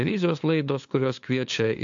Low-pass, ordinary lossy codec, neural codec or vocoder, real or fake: 7.2 kHz; AAC, 64 kbps; codec, 16 kHz, 2 kbps, FunCodec, trained on LibriTTS, 25 frames a second; fake